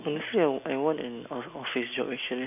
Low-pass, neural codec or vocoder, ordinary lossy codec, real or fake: 3.6 kHz; none; none; real